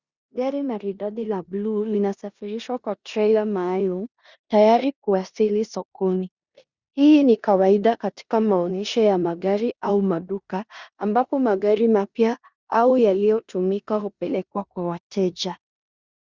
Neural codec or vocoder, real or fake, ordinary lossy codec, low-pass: codec, 16 kHz in and 24 kHz out, 0.9 kbps, LongCat-Audio-Codec, four codebook decoder; fake; Opus, 64 kbps; 7.2 kHz